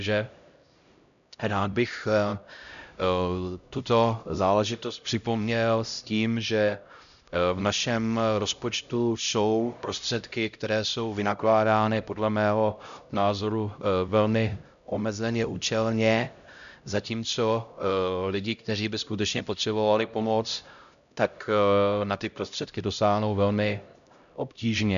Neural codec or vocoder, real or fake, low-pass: codec, 16 kHz, 0.5 kbps, X-Codec, HuBERT features, trained on LibriSpeech; fake; 7.2 kHz